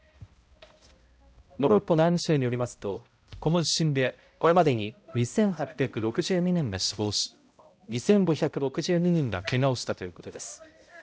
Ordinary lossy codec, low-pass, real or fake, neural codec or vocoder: none; none; fake; codec, 16 kHz, 0.5 kbps, X-Codec, HuBERT features, trained on balanced general audio